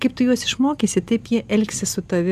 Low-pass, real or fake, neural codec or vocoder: 14.4 kHz; real; none